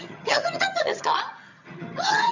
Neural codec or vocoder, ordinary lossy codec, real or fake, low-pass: vocoder, 22.05 kHz, 80 mel bands, HiFi-GAN; none; fake; 7.2 kHz